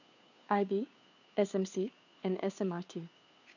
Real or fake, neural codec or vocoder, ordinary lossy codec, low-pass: fake; codec, 16 kHz, 2 kbps, FunCodec, trained on Chinese and English, 25 frames a second; none; 7.2 kHz